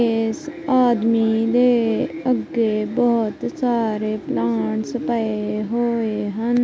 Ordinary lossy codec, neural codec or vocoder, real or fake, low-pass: none; none; real; none